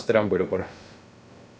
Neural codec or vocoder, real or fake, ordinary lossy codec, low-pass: codec, 16 kHz, about 1 kbps, DyCAST, with the encoder's durations; fake; none; none